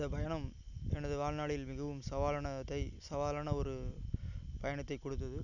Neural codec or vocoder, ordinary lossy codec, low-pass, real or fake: none; none; 7.2 kHz; real